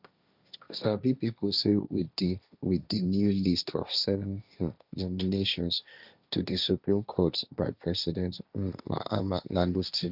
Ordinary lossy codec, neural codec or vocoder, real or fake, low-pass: none; codec, 16 kHz, 1.1 kbps, Voila-Tokenizer; fake; 5.4 kHz